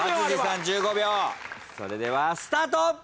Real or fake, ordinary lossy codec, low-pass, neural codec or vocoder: real; none; none; none